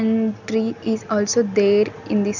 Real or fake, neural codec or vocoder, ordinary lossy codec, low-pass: real; none; none; 7.2 kHz